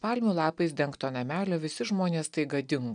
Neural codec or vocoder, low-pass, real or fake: none; 9.9 kHz; real